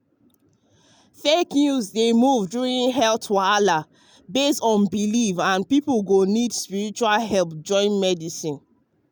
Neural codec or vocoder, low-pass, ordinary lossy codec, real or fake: none; none; none; real